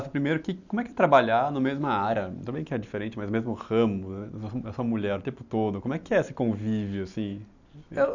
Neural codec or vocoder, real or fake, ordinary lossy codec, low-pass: none; real; none; 7.2 kHz